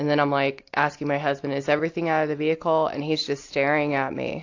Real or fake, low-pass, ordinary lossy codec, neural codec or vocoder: real; 7.2 kHz; AAC, 48 kbps; none